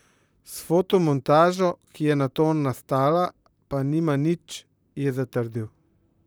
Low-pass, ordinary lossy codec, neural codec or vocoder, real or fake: none; none; vocoder, 44.1 kHz, 128 mel bands, Pupu-Vocoder; fake